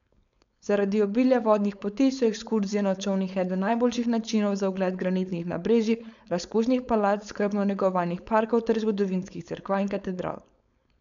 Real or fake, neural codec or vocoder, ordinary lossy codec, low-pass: fake; codec, 16 kHz, 4.8 kbps, FACodec; none; 7.2 kHz